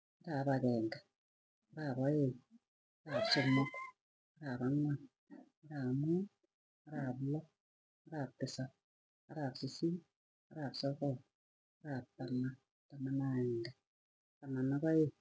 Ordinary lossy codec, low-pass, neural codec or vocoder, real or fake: none; none; none; real